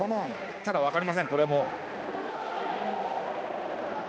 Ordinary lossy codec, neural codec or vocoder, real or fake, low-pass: none; codec, 16 kHz, 2 kbps, X-Codec, HuBERT features, trained on balanced general audio; fake; none